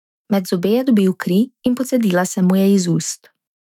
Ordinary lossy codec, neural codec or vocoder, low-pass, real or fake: none; autoencoder, 48 kHz, 128 numbers a frame, DAC-VAE, trained on Japanese speech; 19.8 kHz; fake